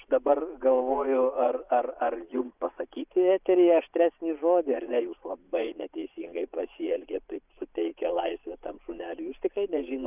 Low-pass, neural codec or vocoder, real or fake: 3.6 kHz; vocoder, 22.05 kHz, 80 mel bands, Vocos; fake